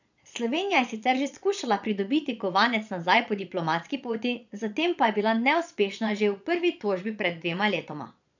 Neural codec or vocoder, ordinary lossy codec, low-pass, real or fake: vocoder, 22.05 kHz, 80 mel bands, Vocos; none; 7.2 kHz; fake